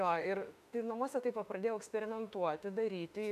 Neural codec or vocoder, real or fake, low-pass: autoencoder, 48 kHz, 32 numbers a frame, DAC-VAE, trained on Japanese speech; fake; 14.4 kHz